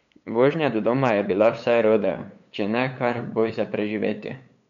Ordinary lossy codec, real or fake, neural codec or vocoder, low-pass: none; fake; codec, 16 kHz, 8 kbps, FunCodec, trained on LibriTTS, 25 frames a second; 7.2 kHz